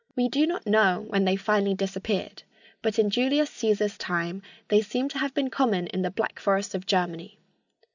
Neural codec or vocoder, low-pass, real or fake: none; 7.2 kHz; real